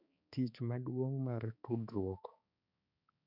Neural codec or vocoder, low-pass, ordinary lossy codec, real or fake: codec, 16 kHz, 4 kbps, X-Codec, HuBERT features, trained on balanced general audio; 5.4 kHz; none; fake